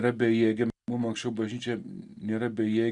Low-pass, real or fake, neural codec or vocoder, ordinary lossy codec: 10.8 kHz; real; none; Opus, 64 kbps